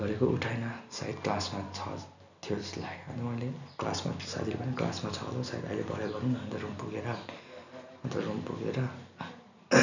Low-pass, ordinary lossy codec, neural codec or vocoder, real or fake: 7.2 kHz; none; none; real